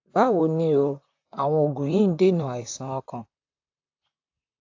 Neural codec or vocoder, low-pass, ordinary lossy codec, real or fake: vocoder, 44.1 kHz, 128 mel bands, Pupu-Vocoder; 7.2 kHz; AAC, 48 kbps; fake